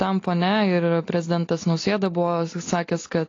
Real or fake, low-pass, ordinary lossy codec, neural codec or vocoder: real; 7.2 kHz; AAC, 32 kbps; none